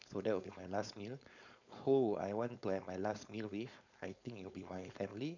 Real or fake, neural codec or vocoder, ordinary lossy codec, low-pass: fake; codec, 16 kHz, 4.8 kbps, FACodec; none; 7.2 kHz